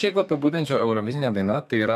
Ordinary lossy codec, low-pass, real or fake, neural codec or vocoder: AAC, 96 kbps; 14.4 kHz; fake; codec, 32 kHz, 1.9 kbps, SNAC